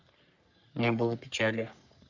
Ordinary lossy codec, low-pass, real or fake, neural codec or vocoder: Opus, 64 kbps; 7.2 kHz; fake; codec, 44.1 kHz, 3.4 kbps, Pupu-Codec